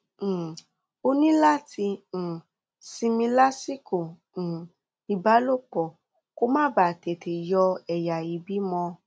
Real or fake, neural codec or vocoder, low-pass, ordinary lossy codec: real; none; none; none